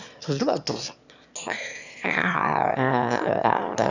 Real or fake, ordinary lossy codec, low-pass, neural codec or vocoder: fake; none; 7.2 kHz; autoencoder, 22.05 kHz, a latent of 192 numbers a frame, VITS, trained on one speaker